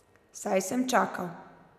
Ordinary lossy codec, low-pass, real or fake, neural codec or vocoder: none; 14.4 kHz; real; none